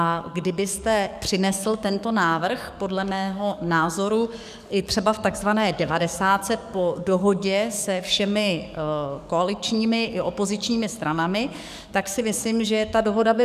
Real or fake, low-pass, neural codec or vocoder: fake; 14.4 kHz; codec, 44.1 kHz, 7.8 kbps, DAC